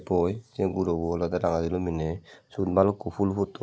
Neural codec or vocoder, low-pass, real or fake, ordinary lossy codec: none; none; real; none